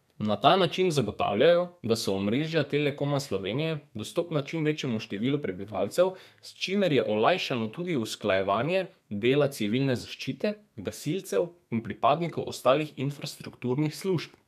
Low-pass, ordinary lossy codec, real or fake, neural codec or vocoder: 14.4 kHz; none; fake; codec, 32 kHz, 1.9 kbps, SNAC